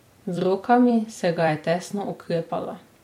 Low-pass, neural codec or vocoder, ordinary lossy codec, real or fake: 19.8 kHz; vocoder, 44.1 kHz, 128 mel bands every 256 samples, BigVGAN v2; MP3, 64 kbps; fake